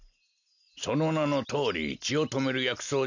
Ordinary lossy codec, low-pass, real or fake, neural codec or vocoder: none; 7.2 kHz; real; none